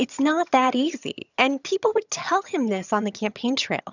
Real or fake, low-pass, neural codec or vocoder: fake; 7.2 kHz; vocoder, 22.05 kHz, 80 mel bands, HiFi-GAN